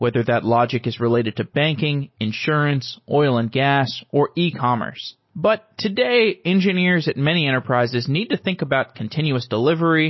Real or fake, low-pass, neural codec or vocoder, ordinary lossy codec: real; 7.2 kHz; none; MP3, 24 kbps